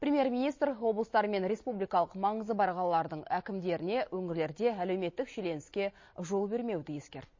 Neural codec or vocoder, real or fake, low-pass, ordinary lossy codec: none; real; 7.2 kHz; MP3, 32 kbps